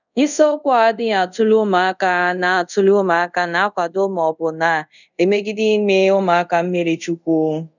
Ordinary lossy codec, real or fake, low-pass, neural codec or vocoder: none; fake; 7.2 kHz; codec, 24 kHz, 0.5 kbps, DualCodec